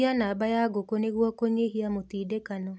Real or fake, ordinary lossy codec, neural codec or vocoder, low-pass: real; none; none; none